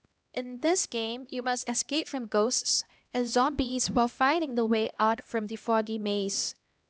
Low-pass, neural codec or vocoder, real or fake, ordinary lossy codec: none; codec, 16 kHz, 1 kbps, X-Codec, HuBERT features, trained on LibriSpeech; fake; none